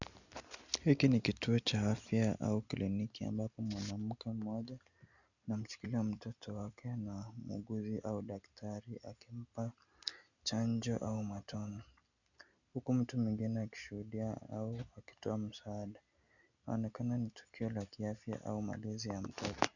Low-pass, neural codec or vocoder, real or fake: 7.2 kHz; none; real